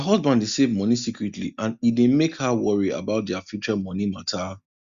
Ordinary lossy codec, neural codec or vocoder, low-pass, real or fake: Opus, 64 kbps; none; 7.2 kHz; real